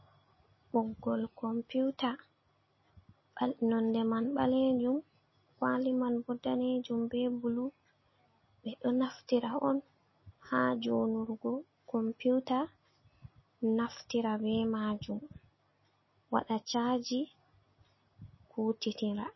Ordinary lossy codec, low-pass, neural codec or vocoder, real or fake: MP3, 24 kbps; 7.2 kHz; none; real